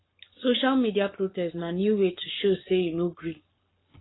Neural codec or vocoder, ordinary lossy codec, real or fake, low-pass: codec, 44.1 kHz, 7.8 kbps, Pupu-Codec; AAC, 16 kbps; fake; 7.2 kHz